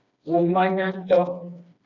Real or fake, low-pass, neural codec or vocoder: fake; 7.2 kHz; codec, 16 kHz, 2 kbps, FreqCodec, smaller model